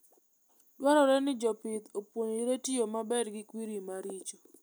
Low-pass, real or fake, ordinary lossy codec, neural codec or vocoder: none; real; none; none